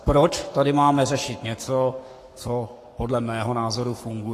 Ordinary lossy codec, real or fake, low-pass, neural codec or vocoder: AAC, 48 kbps; fake; 14.4 kHz; codec, 44.1 kHz, 7.8 kbps, Pupu-Codec